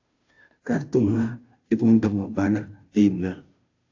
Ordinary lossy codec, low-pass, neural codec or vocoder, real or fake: AAC, 48 kbps; 7.2 kHz; codec, 16 kHz, 0.5 kbps, FunCodec, trained on Chinese and English, 25 frames a second; fake